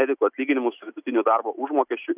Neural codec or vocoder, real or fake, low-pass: none; real; 3.6 kHz